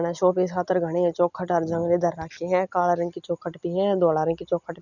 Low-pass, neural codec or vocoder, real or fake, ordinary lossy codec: 7.2 kHz; none; real; none